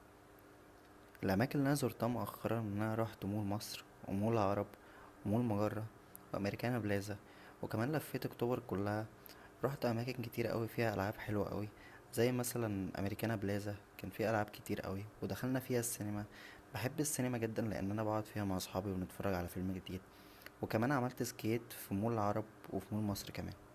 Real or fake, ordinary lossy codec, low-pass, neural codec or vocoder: real; Opus, 64 kbps; 14.4 kHz; none